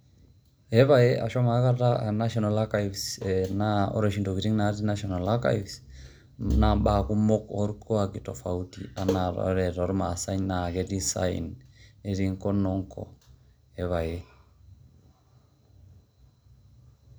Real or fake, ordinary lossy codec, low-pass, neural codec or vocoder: real; none; none; none